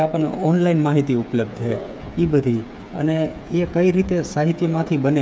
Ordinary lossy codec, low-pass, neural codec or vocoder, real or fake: none; none; codec, 16 kHz, 8 kbps, FreqCodec, smaller model; fake